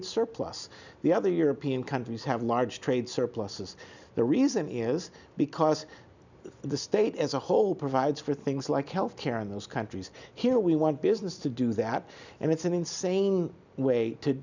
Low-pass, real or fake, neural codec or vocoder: 7.2 kHz; real; none